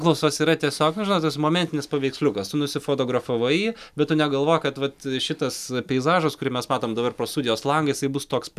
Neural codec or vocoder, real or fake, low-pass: none; real; 14.4 kHz